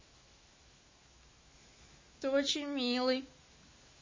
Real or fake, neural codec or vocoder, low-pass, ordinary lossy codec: fake; autoencoder, 48 kHz, 128 numbers a frame, DAC-VAE, trained on Japanese speech; 7.2 kHz; MP3, 32 kbps